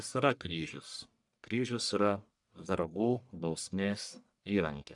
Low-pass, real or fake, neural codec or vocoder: 10.8 kHz; fake; codec, 44.1 kHz, 1.7 kbps, Pupu-Codec